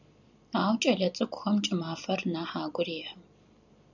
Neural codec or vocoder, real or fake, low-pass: vocoder, 44.1 kHz, 128 mel bands every 256 samples, BigVGAN v2; fake; 7.2 kHz